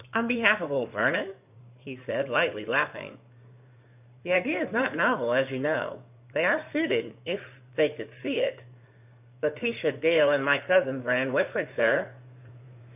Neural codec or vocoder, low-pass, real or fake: codec, 16 kHz in and 24 kHz out, 2.2 kbps, FireRedTTS-2 codec; 3.6 kHz; fake